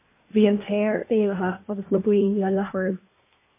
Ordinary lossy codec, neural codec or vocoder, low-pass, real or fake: MP3, 24 kbps; codec, 24 kHz, 1 kbps, SNAC; 3.6 kHz; fake